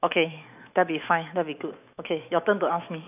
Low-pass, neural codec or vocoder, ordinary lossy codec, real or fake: 3.6 kHz; autoencoder, 48 kHz, 128 numbers a frame, DAC-VAE, trained on Japanese speech; none; fake